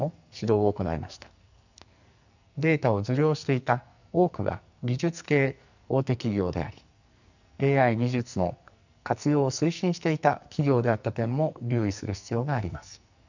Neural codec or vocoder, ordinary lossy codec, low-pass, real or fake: codec, 44.1 kHz, 2.6 kbps, SNAC; none; 7.2 kHz; fake